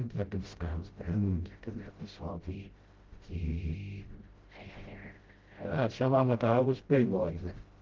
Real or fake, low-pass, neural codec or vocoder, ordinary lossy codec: fake; 7.2 kHz; codec, 16 kHz, 0.5 kbps, FreqCodec, smaller model; Opus, 16 kbps